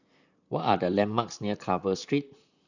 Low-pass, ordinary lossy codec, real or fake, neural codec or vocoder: 7.2 kHz; none; fake; vocoder, 44.1 kHz, 128 mel bands, Pupu-Vocoder